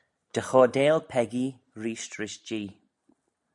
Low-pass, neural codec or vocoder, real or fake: 10.8 kHz; none; real